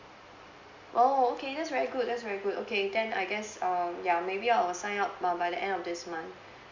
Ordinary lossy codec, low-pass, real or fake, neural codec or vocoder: MP3, 64 kbps; 7.2 kHz; real; none